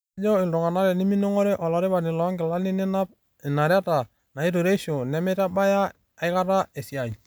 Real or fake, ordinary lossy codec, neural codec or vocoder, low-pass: real; none; none; none